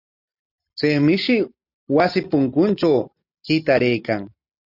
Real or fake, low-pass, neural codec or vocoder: real; 5.4 kHz; none